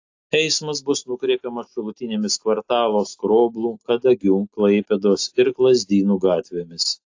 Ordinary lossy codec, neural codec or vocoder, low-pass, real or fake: AAC, 48 kbps; none; 7.2 kHz; real